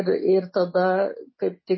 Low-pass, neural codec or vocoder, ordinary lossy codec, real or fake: 7.2 kHz; none; MP3, 24 kbps; real